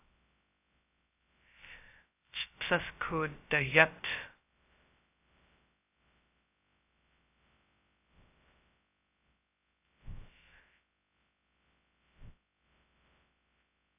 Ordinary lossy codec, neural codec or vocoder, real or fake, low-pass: AAC, 24 kbps; codec, 16 kHz, 0.2 kbps, FocalCodec; fake; 3.6 kHz